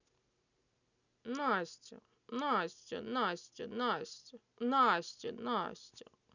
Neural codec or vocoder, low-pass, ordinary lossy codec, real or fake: none; 7.2 kHz; none; real